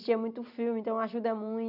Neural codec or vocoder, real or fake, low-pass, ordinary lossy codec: none; real; 5.4 kHz; none